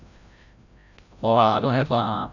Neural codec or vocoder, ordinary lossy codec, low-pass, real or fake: codec, 16 kHz, 0.5 kbps, FreqCodec, larger model; none; 7.2 kHz; fake